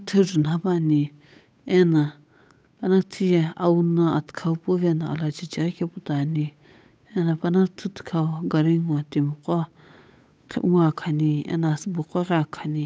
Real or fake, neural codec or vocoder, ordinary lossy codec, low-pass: fake; codec, 16 kHz, 8 kbps, FunCodec, trained on Chinese and English, 25 frames a second; none; none